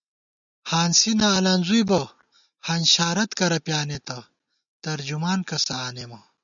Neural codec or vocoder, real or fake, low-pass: none; real; 7.2 kHz